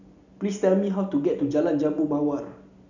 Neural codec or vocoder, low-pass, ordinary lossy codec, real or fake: none; 7.2 kHz; none; real